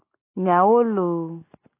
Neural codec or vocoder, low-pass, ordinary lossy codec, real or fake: none; 3.6 kHz; AAC, 24 kbps; real